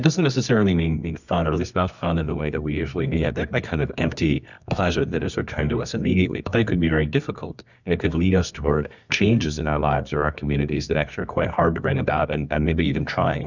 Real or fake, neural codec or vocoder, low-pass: fake; codec, 24 kHz, 0.9 kbps, WavTokenizer, medium music audio release; 7.2 kHz